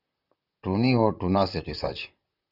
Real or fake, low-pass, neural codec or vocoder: fake; 5.4 kHz; vocoder, 44.1 kHz, 128 mel bands, Pupu-Vocoder